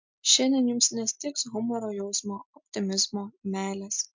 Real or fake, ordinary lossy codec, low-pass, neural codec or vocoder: real; MP3, 64 kbps; 7.2 kHz; none